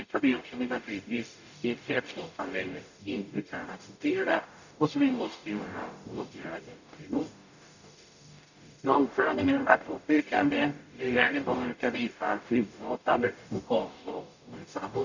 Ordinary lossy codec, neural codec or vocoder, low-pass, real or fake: none; codec, 44.1 kHz, 0.9 kbps, DAC; 7.2 kHz; fake